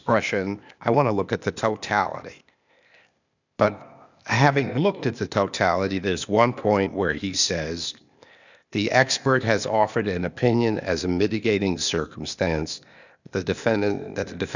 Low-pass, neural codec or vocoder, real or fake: 7.2 kHz; codec, 16 kHz, 0.8 kbps, ZipCodec; fake